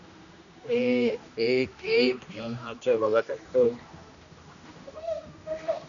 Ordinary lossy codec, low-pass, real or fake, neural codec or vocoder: MP3, 96 kbps; 7.2 kHz; fake; codec, 16 kHz, 1 kbps, X-Codec, HuBERT features, trained on general audio